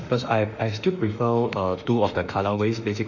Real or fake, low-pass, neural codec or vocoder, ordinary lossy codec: fake; 7.2 kHz; autoencoder, 48 kHz, 32 numbers a frame, DAC-VAE, trained on Japanese speech; none